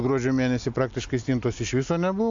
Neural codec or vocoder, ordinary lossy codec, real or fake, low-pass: none; AAC, 64 kbps; real; 7.2 kHz